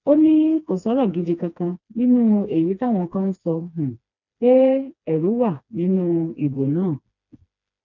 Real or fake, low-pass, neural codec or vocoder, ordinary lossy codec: fake; 7.2 kHz; codec, 16 kHz, 2 kbps, FreqCodec, smaller model; none